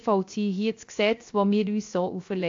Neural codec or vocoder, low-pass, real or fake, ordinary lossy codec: codec, 16 kHz, 0.3 kbps, FocalCodec; 7.2 kHz; fake; AAC, 48 kbps